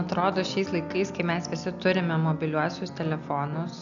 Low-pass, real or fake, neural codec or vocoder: 7.2 kHz; real; none